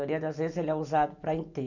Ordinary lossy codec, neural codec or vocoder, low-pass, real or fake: none; none; 7.2 kHz; real